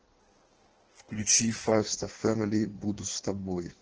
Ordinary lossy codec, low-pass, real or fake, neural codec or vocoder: Opus, 16 kbps; 7.2 kHz; fake; codec, 16 kHz in and 24 kHz out, 1.1 kbps, FireRedTTS-2 codec